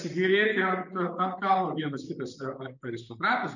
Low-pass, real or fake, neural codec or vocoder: 7.2 kHz; fake; vocoder, 44.1 kHz, 128 mel bands, Pupu-Vocoder